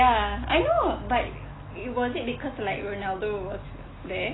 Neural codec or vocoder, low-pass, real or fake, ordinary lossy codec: none; 7.2 kHz; real; AAC, 16 kbps